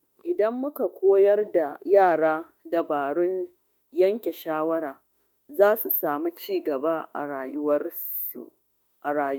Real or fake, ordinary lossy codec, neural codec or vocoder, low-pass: fake; none; autoencoder, 48 kHz, 32 numbers a frame, DAC-VAE, trained on Japanese speech; none